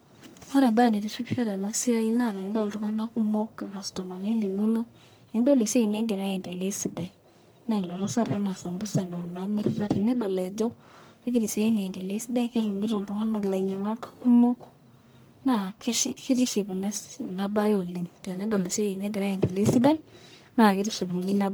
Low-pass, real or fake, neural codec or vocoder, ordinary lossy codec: none; fake; codec, 44.1 kHz, 1.7 kbps, Pupu-Codec; none